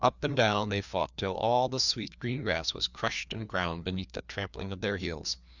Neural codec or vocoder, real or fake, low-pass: codec, 16 kHz, 2 kbps, FreqCodec, larger model; fake; 7.2 kHz